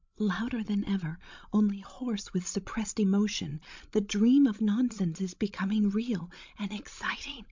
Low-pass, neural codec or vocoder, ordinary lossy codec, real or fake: 7.2 kHz; codec, 16 kHz, 16 kbps, FreqCodec, larger model; MP3, 64 kbps; fake